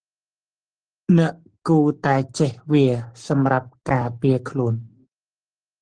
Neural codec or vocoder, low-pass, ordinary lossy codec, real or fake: codec, 44.1 kHz, 7.8 kbps, Pupu-Codec; 9.9 kHz; Opus, 16 kbps; fake